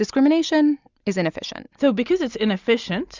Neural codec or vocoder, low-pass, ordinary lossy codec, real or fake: none; 7.2 kHz; Opus, 64 kbps; real